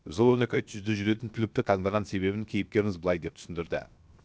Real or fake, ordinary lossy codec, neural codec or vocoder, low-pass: fake; none; codec, 16 kHz, 0.7 kbps, FocalCodec; none